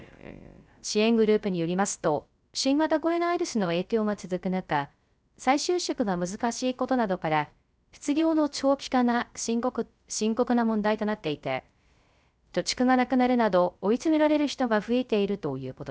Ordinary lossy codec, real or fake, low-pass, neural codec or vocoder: none; fake; none; codec, 16 kHz, 0.3 kbps, FocalCodec